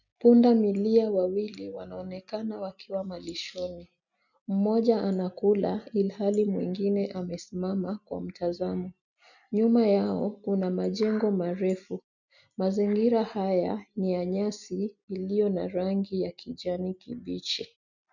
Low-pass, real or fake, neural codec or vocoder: 7.2 kHz; real; none